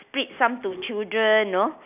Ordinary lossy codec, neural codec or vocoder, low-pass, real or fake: none; none; 3.6 kHz; real